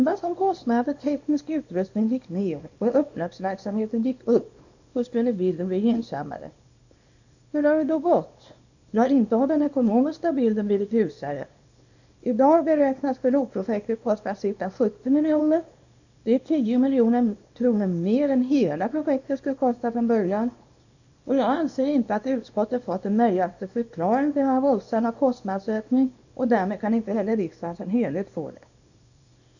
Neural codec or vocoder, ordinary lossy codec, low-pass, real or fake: codec, 24 kHz, 0.9 kbps, WavTokenizer, small release; none; 7.2 kHz; fake